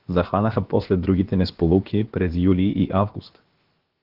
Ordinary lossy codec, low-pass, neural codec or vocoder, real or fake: Opus, 16 kbps; 5.4 kHz; codec, 16 kHz, about 1 kbps, DyCAST, with the encoder's durations; fake